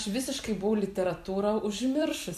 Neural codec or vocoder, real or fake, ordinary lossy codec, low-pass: vocoder, 44.1 kHz, 128 mel bands every 256 samples, BigVGAN v2; fake; AAC, 96 kbps; 14.4 kHz